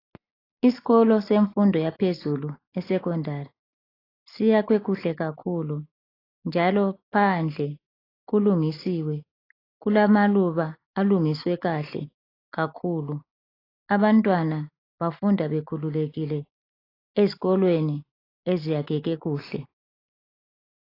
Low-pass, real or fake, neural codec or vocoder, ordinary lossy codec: 5.4 kHz; real; none; AAC, 24 kbps